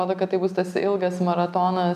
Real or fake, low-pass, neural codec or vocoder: real; 14.4 kHz; none